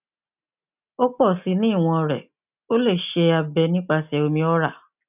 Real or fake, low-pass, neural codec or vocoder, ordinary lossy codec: real; 3.6 kHz; none; none